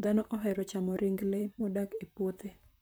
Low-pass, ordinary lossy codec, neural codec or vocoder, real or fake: none; none; vocoder, 44.1 kHz, 128 mel bands, Pupu-Vocoder; fake